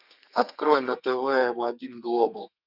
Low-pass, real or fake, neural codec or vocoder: 5.4 kHz; fake; codec, 32 kHz, 1.9 kbps, SNAC